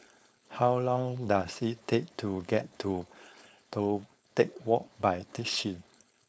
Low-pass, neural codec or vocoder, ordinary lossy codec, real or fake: none; codec, 16 kHz, 4.8 kbps, FACodec; none; fake